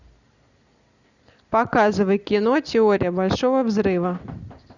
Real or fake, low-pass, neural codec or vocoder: real; 7.2 kHz; none